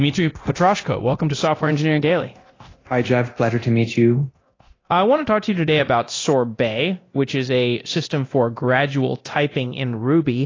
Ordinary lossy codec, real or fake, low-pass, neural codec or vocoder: AAC, 32 kbps; fake; 7.2 kHz; codec, 24 kHz, 0.9 kbps, DualCodec